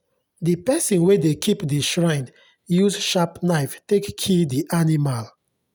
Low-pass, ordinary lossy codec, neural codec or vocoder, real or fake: none; none; none; real